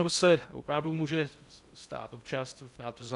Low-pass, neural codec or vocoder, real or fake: 10.8 kHz; codec, 16 kHz in and 24 kHz out, 0.6 kbps, FocalCodec, streaming, 2048 codes; fake